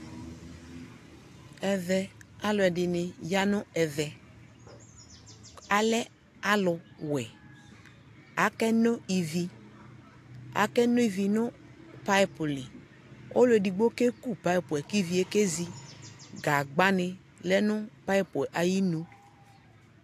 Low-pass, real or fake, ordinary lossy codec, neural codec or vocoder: 14.4 kHz; real; AAC, 64 kbps; none